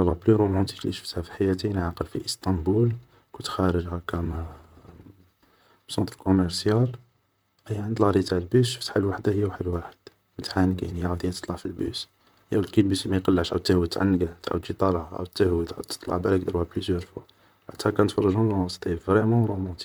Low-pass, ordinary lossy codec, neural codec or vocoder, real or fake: none; none; vocoder, 44.1 kHz, 128 mel bands, Pupu-Vocoder; fake